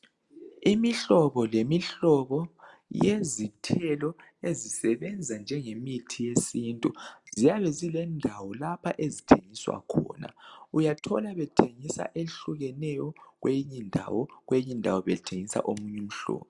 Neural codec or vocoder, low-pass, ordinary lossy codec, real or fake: none; 10.8 kHz; Opus, 64 kbps; real